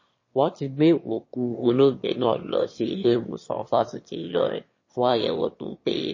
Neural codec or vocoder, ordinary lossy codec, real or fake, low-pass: autoencoder, 22.05 kHz, a latent of 192 numbers a frame, VITS, trained on one speaker; MP3, 32 kbps; fake; 7.2 kHz